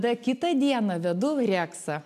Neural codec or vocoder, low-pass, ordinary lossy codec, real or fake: none; 14.4 kHz; MP3, 96 kbps; real